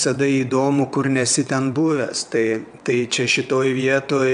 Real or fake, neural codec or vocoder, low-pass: fake; vocoder, 22.05 kHz, 80 mel bands, WaveNeXt; 9.9 kHz